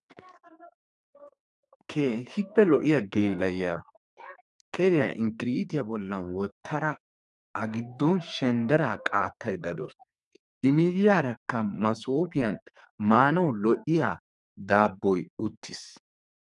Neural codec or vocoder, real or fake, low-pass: codec, 44.1 kHz, 2.6 kbps, SNAC; fake; 10.8 kHz